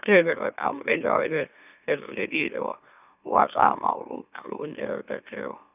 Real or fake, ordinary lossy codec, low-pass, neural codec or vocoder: fake; none; 3.6 kHz; autoencoder, 44.1 kHz, a latent of 192 numbers a frame, MeloTTS